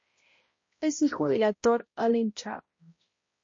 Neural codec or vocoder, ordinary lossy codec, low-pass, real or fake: codec, 16 kHz, 0.5 kbps, X-Codec, HuBERT features, trained on balanced general audio; MP3, 32 kbps; 7.2 kHz; fake